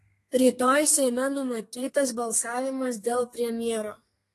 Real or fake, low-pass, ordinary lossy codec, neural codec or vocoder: fake; 14.4 kHz; AAC, 48 kbps; codec, 32 kHz, 1.9 kbps, SNAC